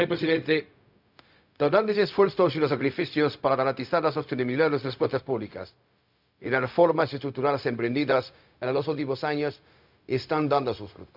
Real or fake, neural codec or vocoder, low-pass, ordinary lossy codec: fake; codec, 16 kHz, 0.4 kbps, LongCat-Audio-Codec; 5.4 kHz; none